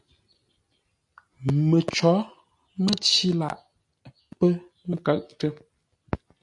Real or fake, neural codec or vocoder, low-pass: real; none; 10.8 kHz